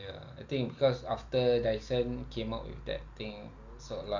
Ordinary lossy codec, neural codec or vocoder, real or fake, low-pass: Opus, 64 kbps; none; real; 7.2 kHz